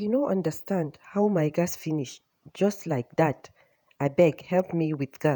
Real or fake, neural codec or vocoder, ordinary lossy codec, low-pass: fake; vocoder, 48 kHz, 128 mel bands, Vocos; none; none